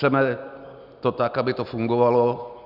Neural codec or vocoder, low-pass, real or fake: none; 5.4 kHz; real